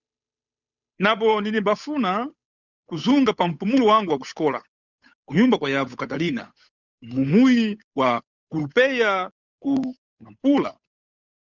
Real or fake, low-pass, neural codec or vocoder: fake; 7.2 kHz; codec, 16 kHz, 8 kbps, FunCodec, trained on Chinese and English, 25 frames a second